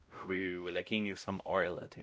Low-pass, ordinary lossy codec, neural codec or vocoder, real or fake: none; none; codec, 16 kHz, 0.5 kbps, X-Codec, WavLM features, trained on Multilingual LibriSpeech; fake